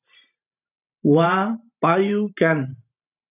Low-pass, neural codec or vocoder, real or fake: 3.6 kHz; vocoder, 44.1 kHz, 128 mel bands every 512 samples, BigVGAN v2; fake